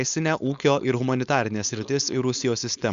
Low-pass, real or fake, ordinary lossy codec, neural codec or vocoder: 7.2 kHz; fake; Opus, 64 kbps; codec, 16 kHz, 4.8 kbps, FACodec